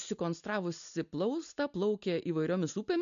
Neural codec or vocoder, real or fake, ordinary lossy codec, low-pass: none; real; MP3, 64 kbps; 7.2 kHz